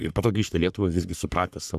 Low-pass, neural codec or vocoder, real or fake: 14.4 kHz; codec, 44.1 kHz, 3.4 kbps, Pupu-Codec; fake